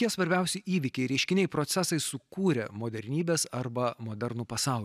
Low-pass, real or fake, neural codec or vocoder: 14.4 kHz; real; none